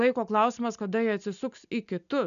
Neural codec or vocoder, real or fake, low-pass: none; real; 7.2 kHz